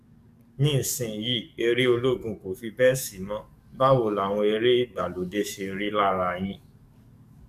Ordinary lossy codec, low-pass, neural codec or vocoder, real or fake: AAC, 96 kbps; 14.4 kHz; codec, 44.1 kHz, 7.8 kbps, DAC; fake